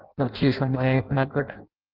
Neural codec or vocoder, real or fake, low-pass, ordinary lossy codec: codec, 16 kHz in and 24 kHz out, 0.6 kbps, FireRedTTS-2 codec; fake; 5.4 kHz; Opus, 24 kbps